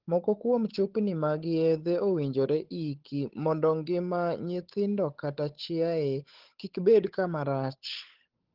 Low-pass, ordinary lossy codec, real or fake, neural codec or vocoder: 5.4 kHz; Opus, 16 kbps; fake; codec, 16 kHz, 8 kbps, FunCodec, trained on Chinese and English, 25 frames a second